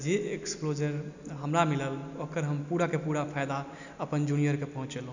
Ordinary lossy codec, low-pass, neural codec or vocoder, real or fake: none; 7.2 kHz; none; real